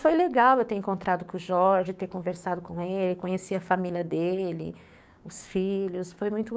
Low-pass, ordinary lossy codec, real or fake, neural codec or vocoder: none; none; fake; codec, 16 kHz, 6 kbps, DAC